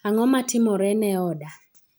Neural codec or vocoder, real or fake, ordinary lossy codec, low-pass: none; real; none; none